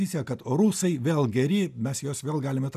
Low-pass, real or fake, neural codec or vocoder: 14.4 kHz; real; none